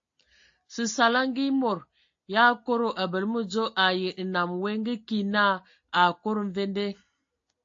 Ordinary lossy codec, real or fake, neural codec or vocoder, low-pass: AAC, 48 kbps; real; none; 7.2 kHz